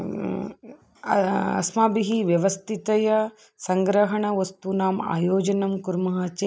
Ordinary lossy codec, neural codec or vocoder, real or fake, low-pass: none; none; real; none